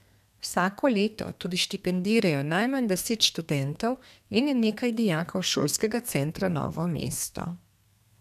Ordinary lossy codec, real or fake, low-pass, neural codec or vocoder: none; fake; 14.4 kHz; codec, 32 kHz, 1.9 kbps, SNAC